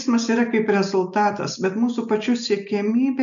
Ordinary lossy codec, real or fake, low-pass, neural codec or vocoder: MP3, 96 kbps; real; 7.2 kHz; none